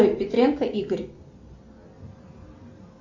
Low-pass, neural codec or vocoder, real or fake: 7.2 kHz; none; real